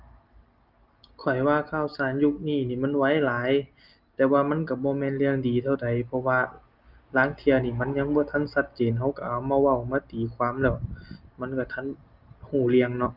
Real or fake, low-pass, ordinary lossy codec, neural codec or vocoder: real; 5.4 kHz; Opus, 32 kbps; none